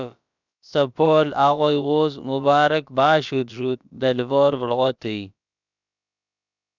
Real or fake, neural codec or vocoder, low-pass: fake; codec, 16 kHz, about 1 kbps, DyCAST, with the encoder's durations; 7.2 kHz